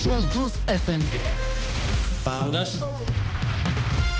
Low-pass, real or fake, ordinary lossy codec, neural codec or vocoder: none; fake; none; codec, 16 kHz, 1 kbps, X-Codec, HuBERT features, trained on balanced general audio